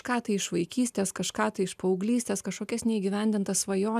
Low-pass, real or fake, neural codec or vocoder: 14.4 kHz; real; none